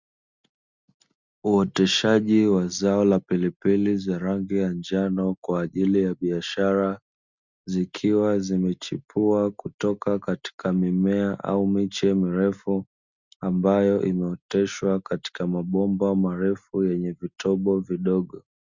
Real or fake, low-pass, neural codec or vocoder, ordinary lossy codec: real; 7.2 kHz; none; Opus, 64 kbps